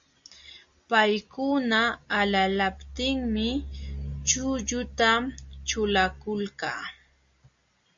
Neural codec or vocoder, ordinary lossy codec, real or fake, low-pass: none; Opus, 64 kbps; real; 7.2 kHz